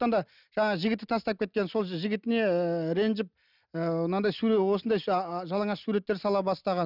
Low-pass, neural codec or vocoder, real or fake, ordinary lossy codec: 5.4 kHz; none; real; none